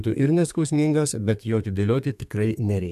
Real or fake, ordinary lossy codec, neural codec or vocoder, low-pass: fake; AAC, 96 kbps; codec, 32 kHz, 1.9 kbps, SNAC; 14.4 kHz